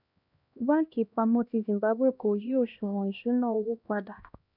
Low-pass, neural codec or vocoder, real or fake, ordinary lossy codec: 5.4 kHz; codec, 16 kHz, 1 kbps, X-Codec, HuBERT features, trained on LibriSpeech; fake; none